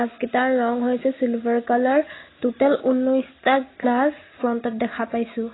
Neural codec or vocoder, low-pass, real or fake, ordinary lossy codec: none; 7.2 kHz; real; AAC, 16 kbps